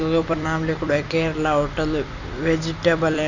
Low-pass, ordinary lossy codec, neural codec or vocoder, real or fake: 7.2 kHz; none; vocoder, 44.1 kHz, 128 mel bands every 256 samples, BigVGAN v2; fake